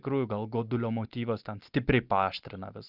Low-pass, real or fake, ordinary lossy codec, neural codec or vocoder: 5.4 kHz; real; Opus, 32 kbps; none